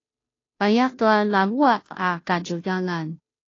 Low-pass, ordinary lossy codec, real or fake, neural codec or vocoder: 7.2 kHz; AAC, 32 kbps; fake; codec, 16 kHz, 0.5 kbps, FunCodec, trained on Chinese and English, 25 frames a second